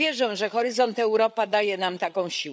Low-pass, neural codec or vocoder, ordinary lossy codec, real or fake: none; codec, 16 kHz, 8 kbps, FreqCodec, larger model; none; fake